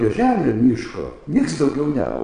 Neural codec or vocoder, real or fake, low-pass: vocoder, 22.05 kHz, 80 mel bands, Vocos; fake; 9.9 kHz